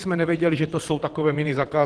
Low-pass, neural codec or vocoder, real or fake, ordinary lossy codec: 9.9 kHz; vocoder, 22.05 kHz, 80 mel bands, WaveNeXt; fake; Opus, 16 kbps